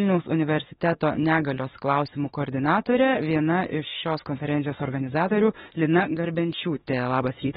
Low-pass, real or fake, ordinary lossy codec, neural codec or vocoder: 19.8 kHz; fake; AAC, 16 kbps; autoencoder, 48 kHz, 32 numbers a frame, DAC-VAE, trained on Japanese speech